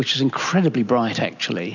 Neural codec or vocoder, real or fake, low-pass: none; real; 7.2 kHz